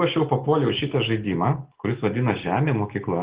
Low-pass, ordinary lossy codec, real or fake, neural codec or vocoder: 3.6 kHz; Opus, 16 kbps; real; none